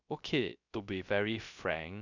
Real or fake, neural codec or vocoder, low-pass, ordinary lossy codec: fake; codec, 16 kHz, 0.3 kbps, FocalCodec; 7.2 kHz; none